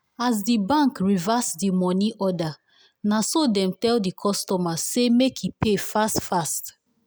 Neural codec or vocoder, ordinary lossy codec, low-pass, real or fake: none; none; none; real